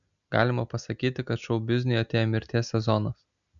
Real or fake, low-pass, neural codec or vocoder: real; 7.2 kHz; none